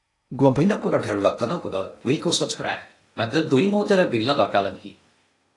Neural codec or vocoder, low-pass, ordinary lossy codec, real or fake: codec, 16 kHz in and 24 kHz out, 0.6 kbps, FocalCodec, streaming, 2048 codes; 10.8 kHz; AAC, 48 kbps; fake